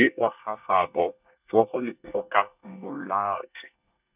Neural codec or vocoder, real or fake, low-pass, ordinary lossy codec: codec, 24 kHz, 1 kbps, SNAC; fake; 3.6 kHz; none